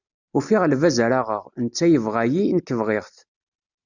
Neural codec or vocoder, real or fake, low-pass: none; real; 7.2 kHz